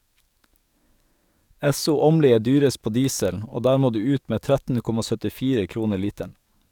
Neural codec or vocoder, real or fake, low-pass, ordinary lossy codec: codec, 44.1 kHz, 7.8 kbps, DAC; fake; 19.8 kHz; none